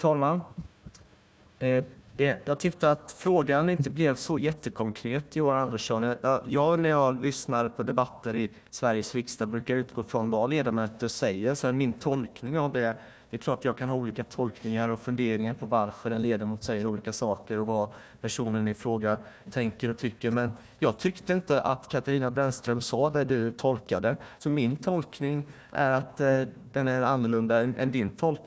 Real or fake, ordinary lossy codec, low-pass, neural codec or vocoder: fake; none; none; codec, 16 kHz, 1 kbps, FunCodec, trained on Chinese and English, 50 frames a second